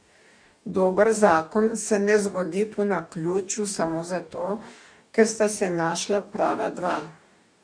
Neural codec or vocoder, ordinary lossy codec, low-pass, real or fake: codec, 44.1 kHz, 2.6 kbps, DAC; none; 9.9 kHz; fake